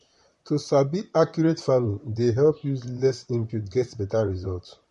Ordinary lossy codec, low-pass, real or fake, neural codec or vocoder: MP3, 48 kbps; 14.4 kHz; fake; vocoder, 44.1 kHz, 128 mel bands, Pupu-Vocoder